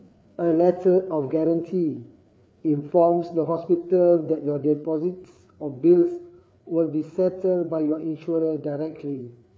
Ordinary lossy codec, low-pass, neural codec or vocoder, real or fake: none; none; codec, 16 kHz, 4 kbps, FreqCodec, larger model; fake